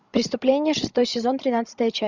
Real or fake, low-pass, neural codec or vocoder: fake; 7.2 kHz; codec, 16 kHz, 16 kbps, FreqCodec, larger model